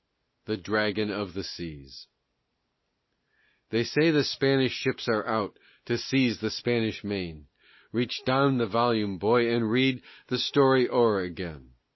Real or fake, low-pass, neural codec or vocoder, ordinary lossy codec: real; 7.2 kHz; none; MP3, 24 kbps